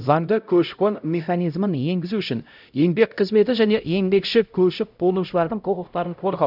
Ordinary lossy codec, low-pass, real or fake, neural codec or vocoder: none; 5.4 kHz; fake; codec, 16 kHz, 0.5 kbps, X-Codec, HuBERT features, trained on LibriSpeech